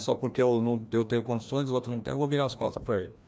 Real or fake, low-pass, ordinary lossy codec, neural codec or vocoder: fake; none; none; codec, 16 kHz, 1 kbps, FreqCodec, larger model